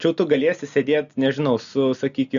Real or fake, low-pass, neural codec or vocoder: real; 7.2 kHz; none